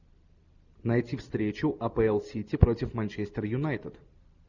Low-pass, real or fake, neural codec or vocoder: 7.2 kHz; real; none